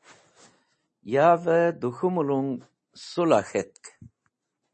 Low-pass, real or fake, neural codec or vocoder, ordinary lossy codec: 10.8 kHz; real; none; MP3, 32 kbps